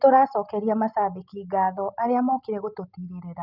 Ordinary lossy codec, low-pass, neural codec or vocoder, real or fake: none; 5.4 kHz; none; real